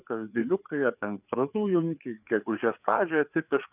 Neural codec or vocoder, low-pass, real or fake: codec, 16 kHz, 4 kbps, FreqCodec, larger model; 3.6 kHz; fake